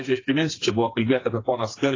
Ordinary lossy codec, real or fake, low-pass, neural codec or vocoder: AAC, 32 kbps; fake; 7.2 kHz; codec, 16 kHz, 4 kbps, FreqCodec, smaller model